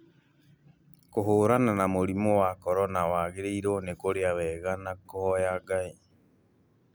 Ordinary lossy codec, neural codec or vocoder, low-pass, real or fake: none; none; none; real